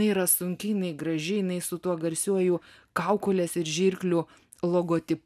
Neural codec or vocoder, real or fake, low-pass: none; real; 14.4 kHz